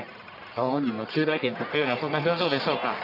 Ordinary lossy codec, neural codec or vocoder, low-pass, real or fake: none; codec, 44.1 kHz, 1.7 kbps, Pupu-Codec; 5.4 kHz; fake